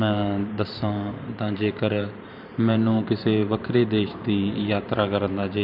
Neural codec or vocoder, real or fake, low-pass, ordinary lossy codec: vocoder, 44.1 kHz, 128 mel bands every 512 samples, BigVGAN v2; fake; 5.4 kHz; none